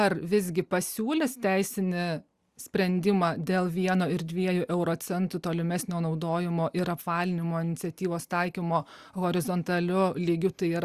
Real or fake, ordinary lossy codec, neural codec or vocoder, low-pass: real; Opus, 64 kbps; none; 14.4 kHz